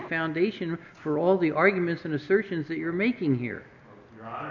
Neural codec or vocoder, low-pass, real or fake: none; 7.2 kHz; real